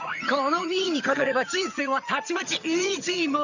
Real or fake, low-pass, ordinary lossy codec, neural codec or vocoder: fake; 7.2 kHz; none; vocoder, 22.05 kHz, 80 mel bands, HiFi-GAN